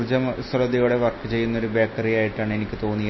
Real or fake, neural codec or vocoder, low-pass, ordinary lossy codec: real; none; 7.2 kHz; MP3, 24 kbps